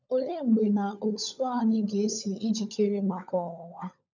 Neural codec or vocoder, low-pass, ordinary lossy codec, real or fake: codec, 16 kHz, 16 kbps, FunCodec, trained on LibriTTS, 50 frames a second; 7.2 kHz; none; fake